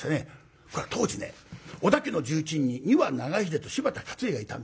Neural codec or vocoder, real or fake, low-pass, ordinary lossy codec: none; real; none; none